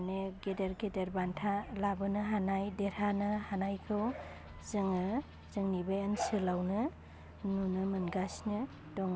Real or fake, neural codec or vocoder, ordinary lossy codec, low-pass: real; none; none; none